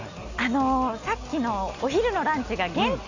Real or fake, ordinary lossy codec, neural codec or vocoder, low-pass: real; none; none; 7.2 kHz